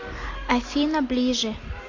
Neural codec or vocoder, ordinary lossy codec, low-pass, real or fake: none; AAC, 48 kbps; 7.2 kHz; real